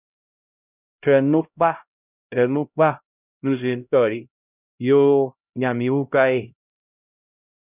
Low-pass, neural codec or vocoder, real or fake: 3.6 kHz; codec, 16 kHz, 0.5 kbps, X-Codec, HuBERT features, trained on LibriSpeech; fake